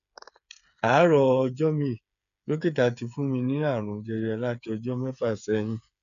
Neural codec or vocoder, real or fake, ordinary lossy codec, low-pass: codec, 16 kHz, 8 kbps, FreqCodec, smaller model; fake; none; 7.2 kHz